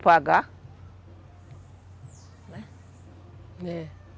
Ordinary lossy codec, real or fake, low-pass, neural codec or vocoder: none; real; none; none